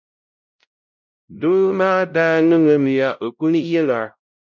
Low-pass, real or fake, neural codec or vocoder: 7.2 kHz; fake; codec, 16 kHz, 0.5 kbps, X-Codec, WavLM features, trained on Multilingual LibriSpeech